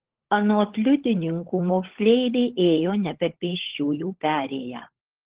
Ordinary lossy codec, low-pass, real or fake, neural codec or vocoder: Opus, 16 kbps; 3.6 kHz; fake; codec, 16 kHz, 16 kbps, FunCodec, trained on LibriTTS, 50 frames a second